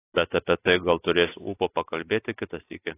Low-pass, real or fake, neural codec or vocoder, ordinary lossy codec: 3.6 kHz; real; none; AAC, 24 kbps